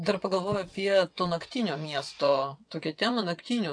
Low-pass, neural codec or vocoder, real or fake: 9.9 kHz; vocoder, 22.05 kHz, 80 mel bands, WaveNeXt; fake